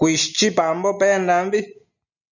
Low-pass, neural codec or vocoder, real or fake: 7.2 kHz; none; real